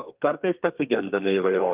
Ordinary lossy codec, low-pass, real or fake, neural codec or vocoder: Opus, 24 kbps; 3.6 kHz; fake; codec, 32 kHz, 1.9 kbps, SNAC